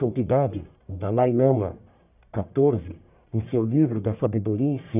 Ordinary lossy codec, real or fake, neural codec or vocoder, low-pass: none; fake; codec, 44.1 kHz, 1.7 kbps, Pupu-Codec; 3.6 kHz